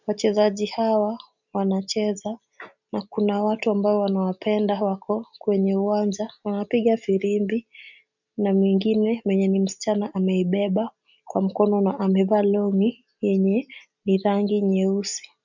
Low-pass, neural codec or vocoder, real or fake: 7.2 kHz; none; real